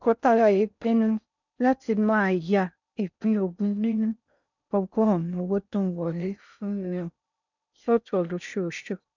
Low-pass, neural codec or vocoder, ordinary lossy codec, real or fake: 7.2 kHz; codec, 16 kHz in and 24 kHz out, 0.6 kbps, FocalCodec, streaming, 4096 codes; none; fake